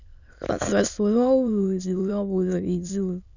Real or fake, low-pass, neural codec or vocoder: fake; 7.2 kHz; autoencoder, 22.05 kHz, a latent of 192 numbers a frame, VITS, trained on many speakers